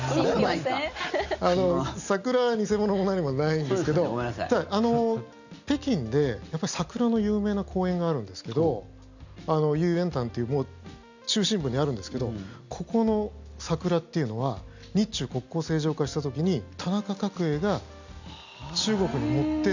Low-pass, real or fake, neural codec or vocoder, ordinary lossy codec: 7.2 kHz; real; none; none